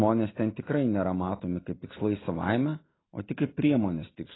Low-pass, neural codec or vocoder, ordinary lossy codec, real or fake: 7.2 kHz; none; AAC, 16 kbps; real